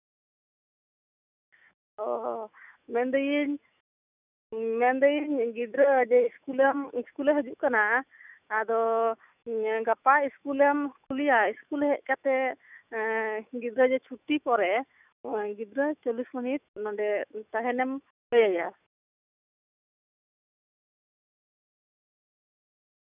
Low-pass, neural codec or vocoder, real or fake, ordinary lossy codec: 3.6 kHz; autoencoder, 48 kHz, 128 numbers a frame, DAC-VAE, trained on Japanese speech; fake; none